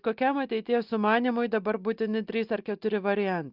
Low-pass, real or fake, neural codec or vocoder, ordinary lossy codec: 5.4 kHz; real; none; Opus, 32 kbps